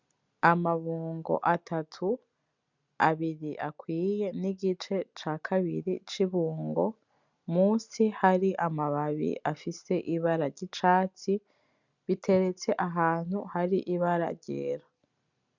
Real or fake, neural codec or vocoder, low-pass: real; none; 7.2 kHz